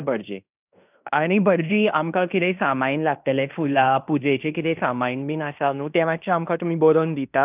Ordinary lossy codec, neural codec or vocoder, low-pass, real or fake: none; codec, 16 kHz in and 24 kHz out, 0.9 kbps, LongCat-Audio-Codec, fine tuned four codebook decoder; 3.6 kHz; fake